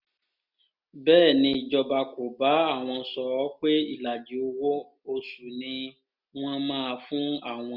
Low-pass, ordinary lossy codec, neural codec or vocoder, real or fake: 5.4 kHz; none; none; real